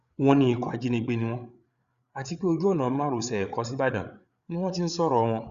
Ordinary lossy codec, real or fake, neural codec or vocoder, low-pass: Opus, 64 kbps; fake; codec, 16 kHz, 8 kbps, FreqCodec, larger model; 7.2 kHz